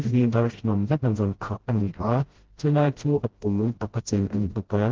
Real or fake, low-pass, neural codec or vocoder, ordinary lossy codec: fake; 7.2 kHz; codec, 16 kHz, 0.5 kbps, FreqCodec, smaller model; Opus, 16 kbps